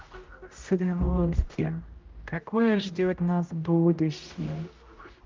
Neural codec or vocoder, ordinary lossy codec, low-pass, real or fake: codec, 16 kHz, 0.5 kbps, X-Codec, HuBERT features, trained on general audio; Opus, 24 kbps; 7.2 kHz; fake